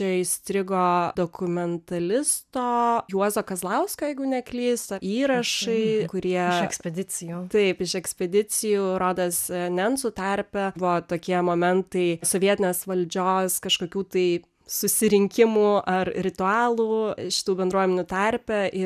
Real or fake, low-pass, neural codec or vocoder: real; 14.4 kHz; none